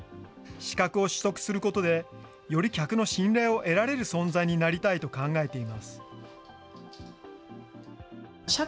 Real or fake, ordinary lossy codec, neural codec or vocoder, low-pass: real; none; none; none